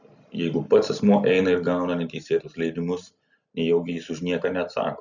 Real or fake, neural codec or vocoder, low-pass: real; none; 7.2 kHz